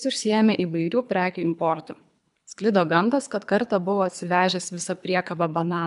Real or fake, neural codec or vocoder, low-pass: fake; codec, 24 kHz, 3 kbps, HILCodec; 10.8 kHz